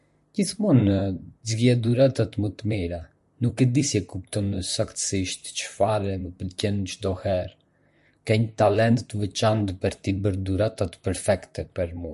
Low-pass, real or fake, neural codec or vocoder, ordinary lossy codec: 14.4 kHz; fake; vocoder, 44.1 kHz, 128 mel bands, Pupu-Vocoder; MP3, 48 kbps